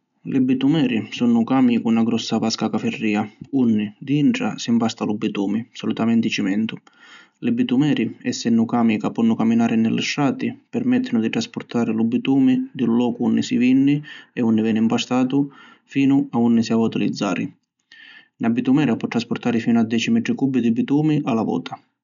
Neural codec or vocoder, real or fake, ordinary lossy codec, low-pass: none; real; none; 7.2 kHz